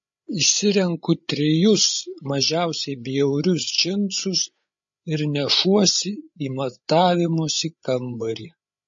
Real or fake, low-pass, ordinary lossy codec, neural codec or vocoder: fake; 7.2 kHz; MP3, 32 kbps; codec, 16 kHz, 8 kbps, FreqCodec, larger model